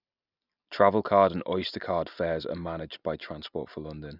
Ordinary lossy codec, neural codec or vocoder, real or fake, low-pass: none; none; real; 5.4 kHz